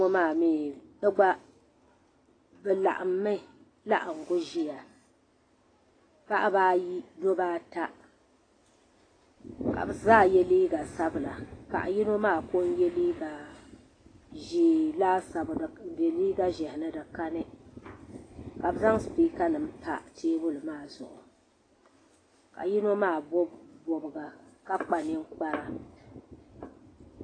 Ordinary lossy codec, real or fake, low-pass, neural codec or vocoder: AAC, 32 kbps; real; 9.9 kHz; none